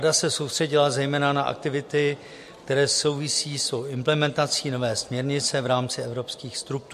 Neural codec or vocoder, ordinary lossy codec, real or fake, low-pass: none; MP3, 64 kbps; real; 14.4 kHz